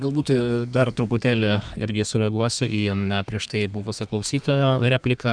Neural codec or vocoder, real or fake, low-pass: codec, 32 kHz, 1.9 kbps, SNAC; fake; 9.9 kHz